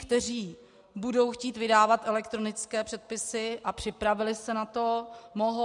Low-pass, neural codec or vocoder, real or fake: 10.8 kHz; none; real